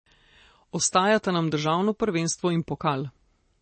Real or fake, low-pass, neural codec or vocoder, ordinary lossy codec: real; 9.9 kHz; none; MP3, 32 kbps